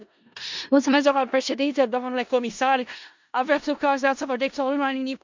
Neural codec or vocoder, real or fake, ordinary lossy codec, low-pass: codec, 16 kHz in and 24 kHz out, 0.4 kbps, LongCat-Audio-Codec, four codebook decoder; fake; MP3, 64 kbps; 7.2 kHz